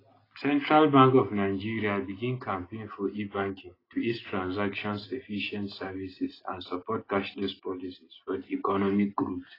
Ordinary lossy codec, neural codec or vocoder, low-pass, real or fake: AAC, 24 kbps; none; 5.4 kHz; real